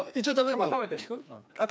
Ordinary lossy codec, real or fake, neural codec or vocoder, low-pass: none; fake; codec, 16 kHz, 2 kbps, FreqCodec, larger model; none